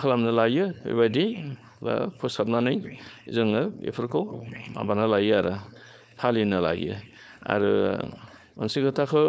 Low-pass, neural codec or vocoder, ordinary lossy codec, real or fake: none; codec, 16 kHz, 4.8 kbps, FACodec; none; fake